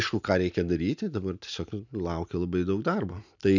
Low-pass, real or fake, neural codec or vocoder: 7.2 kHz; real; none